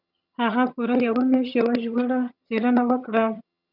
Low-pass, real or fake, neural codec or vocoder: 5.4 kHz; fake; vocoder, 22.05 kHz, 80 mel bands, HiFi-GAN